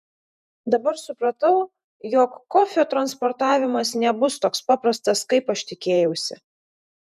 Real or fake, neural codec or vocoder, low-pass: fake; vocoder, 48 kHz, 128 mel bands, Vocos; 14.4 kHz